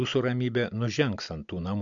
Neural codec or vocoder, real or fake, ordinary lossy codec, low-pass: none; real; MP3, 64 kbps; 7.2 kHz